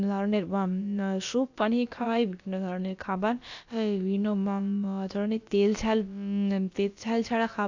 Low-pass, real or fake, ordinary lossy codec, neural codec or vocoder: 7.2 kHz; fake; AAC, 48 kbps; codec, 16 kHz, about 1 kbps, DyCAST, with the encoder's durations